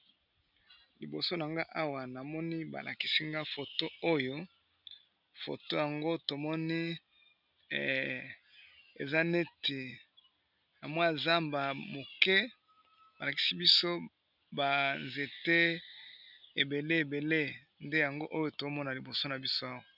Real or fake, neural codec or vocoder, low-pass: real; none; 5.4 kHz